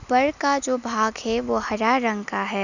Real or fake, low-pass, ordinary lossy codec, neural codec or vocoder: real; 7.2 kHz; none; none